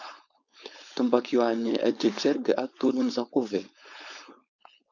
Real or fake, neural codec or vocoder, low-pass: fake; codec, 16 kHz, 4.8 kbps, FACodec; 7.2 kHz